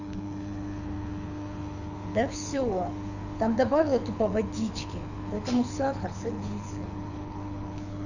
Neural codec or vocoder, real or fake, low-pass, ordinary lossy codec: codec, 24 kHz, 6 kbps, HILCodec; fake; 7.2 kHz; none